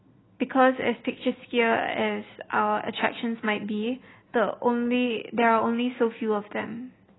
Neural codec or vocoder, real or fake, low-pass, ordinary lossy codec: none; real; 7.2 kHz; AAC, 16 kbps